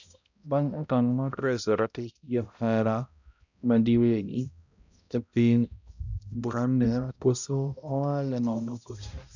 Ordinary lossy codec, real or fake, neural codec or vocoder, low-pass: none; fake; codec, 16 kHz, 0.5 kbps, X-Codec, HuBERT features, trained on balanced general audio; 7.2 kHz